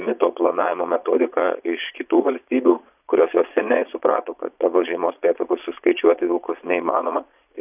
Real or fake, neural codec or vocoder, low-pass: fake; vocoder, 22.05 kHz, 80 mel bands, Vocos; 3.6 kHz